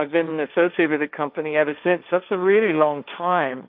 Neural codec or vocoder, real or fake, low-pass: codec, 16 kHz, 1.1 kbps, Voila-Tokenizer; fake; 5.4 kHz